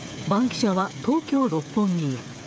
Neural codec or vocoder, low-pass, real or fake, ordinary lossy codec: codec, 16 kHz, 8 kbps, FreqCodec, smaller model; none; fake; none